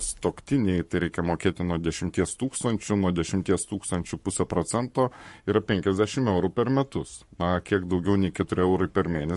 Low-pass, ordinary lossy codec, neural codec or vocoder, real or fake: 14.4 kHz; MP3, 48 kbps; codec, 44.1 kHz, 7.8 kbps, Pupu-Codec; fake